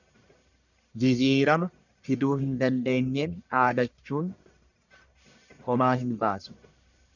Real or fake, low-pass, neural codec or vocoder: fake; 7.2 kHz; codec, 44.1 kHz, 1.7 kbps, Pupu-Codec